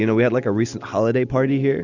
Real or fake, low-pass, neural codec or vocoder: real; 7.2 kHz; none